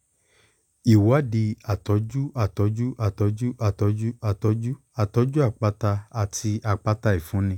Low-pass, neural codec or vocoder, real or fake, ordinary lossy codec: 19.8 kHz; none; real; none